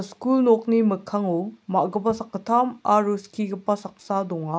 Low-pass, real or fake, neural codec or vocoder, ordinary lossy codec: none; real; none; none